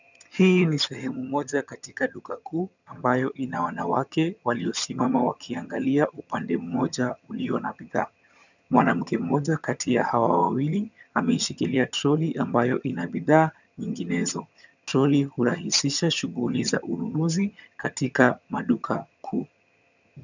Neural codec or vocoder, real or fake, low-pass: vocoder, 22.05 kHz, 80 mel bands, HiFi-GAN; fake; 7.2 kHz